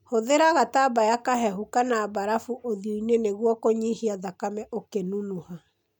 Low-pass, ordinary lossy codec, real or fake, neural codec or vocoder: 19.8 kHz; none; real; none